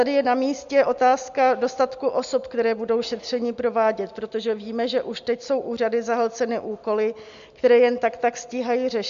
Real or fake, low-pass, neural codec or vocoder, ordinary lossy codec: real; 7.2 kHz; none; MP3, 64 kbps